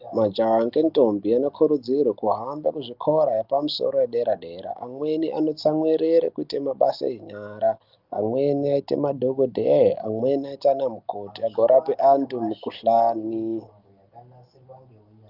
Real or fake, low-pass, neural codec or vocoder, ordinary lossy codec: real; 5.4 kHz; none; Opus, 24 kbps